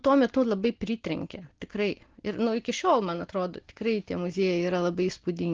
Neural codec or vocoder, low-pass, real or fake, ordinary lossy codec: none; 7.2 kHz; real; Opus, 16 kbps